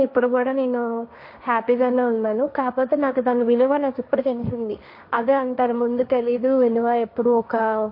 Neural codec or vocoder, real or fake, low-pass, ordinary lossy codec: codec, 16 kHz, 1.1 kbps, Voila-Tokenizer; fake; 5.4 kHz; MP3, 32 kbps